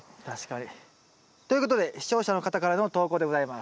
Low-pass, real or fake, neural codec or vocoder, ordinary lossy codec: none; real; none; none